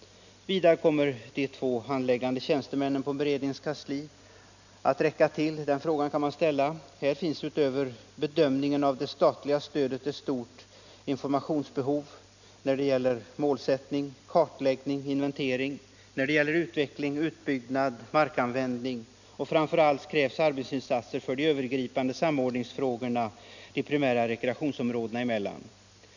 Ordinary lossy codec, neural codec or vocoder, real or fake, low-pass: none; none; real; 7.2 kHz